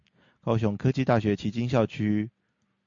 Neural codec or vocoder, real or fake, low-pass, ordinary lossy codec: none; real; 7.2 kHz; MP3, 48 kbps